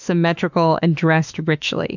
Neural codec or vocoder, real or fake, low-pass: autoencoder, 48 kHz, 32 numbers a frame, DAC-VAE, trained on Japanese speech; fake; 7.2 kHz